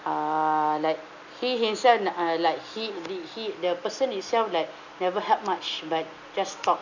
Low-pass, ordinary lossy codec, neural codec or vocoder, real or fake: 7.2 kHz; none; none; real